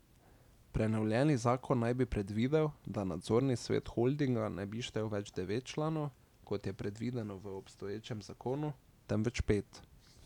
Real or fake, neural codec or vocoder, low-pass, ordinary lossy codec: real; none; 19.8 kHz; none